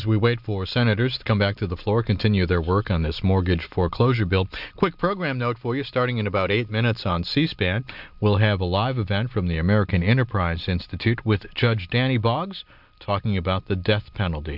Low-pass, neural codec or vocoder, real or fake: 5.4 kHz; none; real